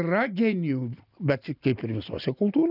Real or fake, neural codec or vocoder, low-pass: real; none; 5.4 kHz